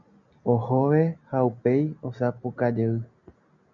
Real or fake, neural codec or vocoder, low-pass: real; none; 7.2 kHz